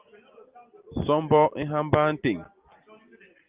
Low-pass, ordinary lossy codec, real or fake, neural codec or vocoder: 3.6 kHz; Opus, 32 kbps; real; none